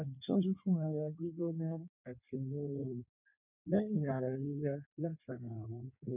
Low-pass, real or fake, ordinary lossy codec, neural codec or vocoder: 3.6 kHz; fake; none; codec, 16 kHz in and 24 kHz out, 1.1 kbps, FireRedTTS-2 codec